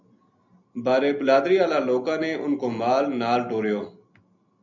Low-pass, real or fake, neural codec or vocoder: 7.2 kHz; real; none